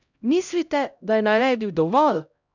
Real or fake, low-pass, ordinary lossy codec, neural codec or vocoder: fake; 7.2 kHz; none; codec, 16 kHz, 0.5 kbps, X-Codec, HuBERT features, trained on LibriSpeech